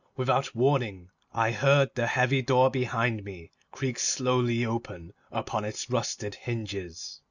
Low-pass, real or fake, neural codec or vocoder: 7.2 kHz; real; none